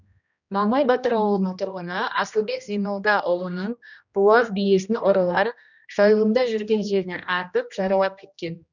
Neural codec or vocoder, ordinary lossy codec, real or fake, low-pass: codec, 16 kHz, 1 kbps, X-Codec, HuBERT features, trained on general audio; none; fake; 7.2 kHz